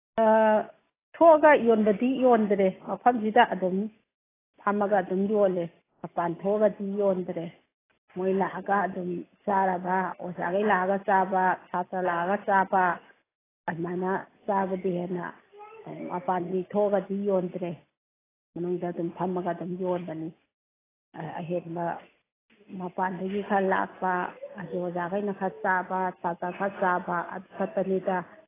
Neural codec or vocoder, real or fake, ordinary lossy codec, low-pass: none; real; AAC, 16 kbps; 3.6 kHz